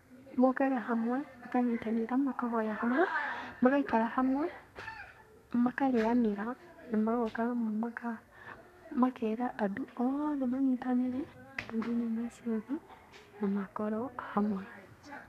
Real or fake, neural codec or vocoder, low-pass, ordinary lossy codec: fake; codec, 32 kHz, 1.9 kbps, SNAC; 14.4 kHz; none